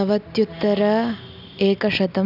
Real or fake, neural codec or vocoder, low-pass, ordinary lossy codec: real; none; 5.4 kHz; none